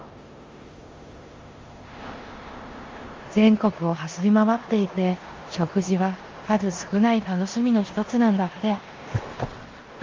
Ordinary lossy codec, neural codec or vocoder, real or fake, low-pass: Opus, 32 kbps; codec, 16 kHz in and 24 kHz out, 0.9 kbps, LongCat-Audio-Codec, four codebook decoder; fake; 7.2 kHz